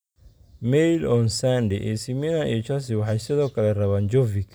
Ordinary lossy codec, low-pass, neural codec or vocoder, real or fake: none; none; none; real